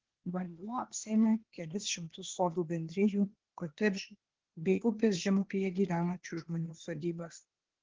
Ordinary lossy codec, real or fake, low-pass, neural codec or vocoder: Opus, 16 kbps; fake; 7.2 kHz; codec, 16 kHz, 0.8 kbps, ZipCodec